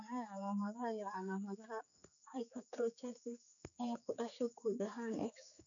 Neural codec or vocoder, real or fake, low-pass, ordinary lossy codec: codec, 16 kHz, 4 kbps, X-Codec, HuBERT features, trained on general audio; fake; 7.2 kHz; none